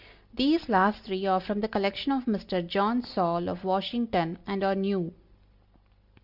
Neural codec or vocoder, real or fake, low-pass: none; real; 5.4 kHz